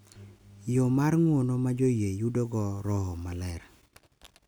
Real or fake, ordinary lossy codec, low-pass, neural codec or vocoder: real; none; none; none